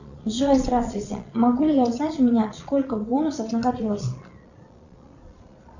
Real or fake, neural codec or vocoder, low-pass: fake; vocoder, 22.05 kHz, 80 mel bands, Vocos; 7.2 kHz